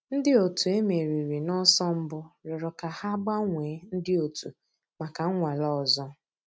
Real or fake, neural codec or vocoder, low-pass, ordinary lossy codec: real; none; none; none